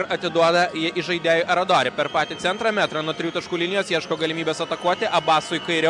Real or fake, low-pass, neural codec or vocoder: real; 10.8 kHz; none